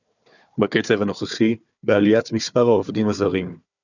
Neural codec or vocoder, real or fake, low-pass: codec, 16 kHz, 4 kbps, FunCodec, trained on Chinese and English, 50 frames a second; fake; 7.2 kHz